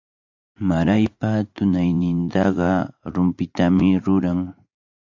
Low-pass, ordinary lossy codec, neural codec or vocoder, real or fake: 7.2 kHz; AAC, 48 kbps; vocoder, 44.1 kHz, 80 mel bands, Vocos; fake